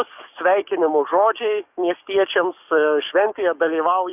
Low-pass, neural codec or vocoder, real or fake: 3.6 kHz; codec, 44.1 kHz, 7.8 kbps, DAC; fake